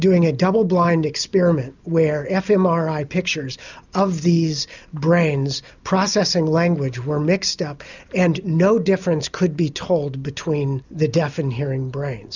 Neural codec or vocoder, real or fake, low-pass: none; real; 7.2 kHz